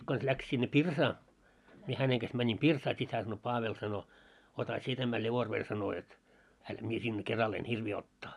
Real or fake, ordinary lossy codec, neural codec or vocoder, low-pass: real; none; none; none